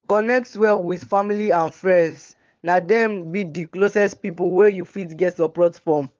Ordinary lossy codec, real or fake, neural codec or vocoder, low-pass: Opus, 32 kbps; fake; codec, 16 kHz, 4 kbps, FunCodec, trained on LibriTTS, 50 frames a second; 7.2 kHz